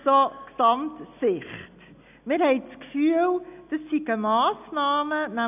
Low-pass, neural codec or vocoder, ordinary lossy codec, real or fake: 3.6 kHz; none; none; real